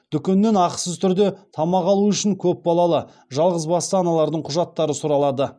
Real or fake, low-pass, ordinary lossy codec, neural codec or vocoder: real; none; none; none